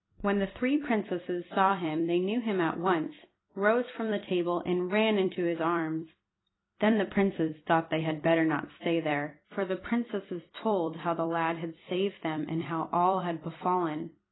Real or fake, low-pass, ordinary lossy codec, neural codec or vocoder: fake; 7.2 kHz; AAC, 16 kbps; vocoder, 44.1 kHz, 128 mel bands every 256 samples, BigVGAN v2